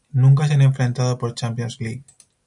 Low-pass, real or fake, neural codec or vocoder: 10.8 kHz; real; none